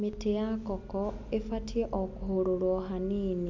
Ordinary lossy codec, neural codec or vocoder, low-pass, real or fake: MP3, 64 kbps; none; 7.2 kHz; real